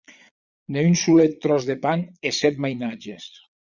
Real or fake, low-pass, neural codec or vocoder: fake; 7.2 kHz; vocoder, 22.05 kHz, 80 mel bands, Vocos